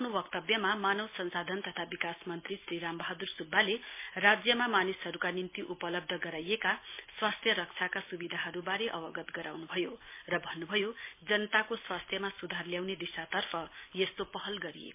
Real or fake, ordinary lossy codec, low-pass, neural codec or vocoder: real; MP3, 24 kbps; 3.6 kHz; none